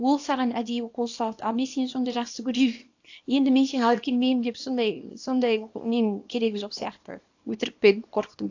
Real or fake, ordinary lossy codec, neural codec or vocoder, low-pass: fake; AAC, 48 kbps; codec, 24 kHz, 0.9 kbps, WavTokenizer, small release; 7.2 kHz